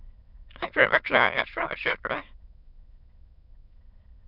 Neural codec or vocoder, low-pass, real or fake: autoencoder, 22.05 kHz, a latent of 192 numbers a frame, VITS, trained on many speakers; 5.4 kHz; fake